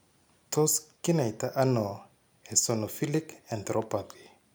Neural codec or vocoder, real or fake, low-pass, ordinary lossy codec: none; real; none; none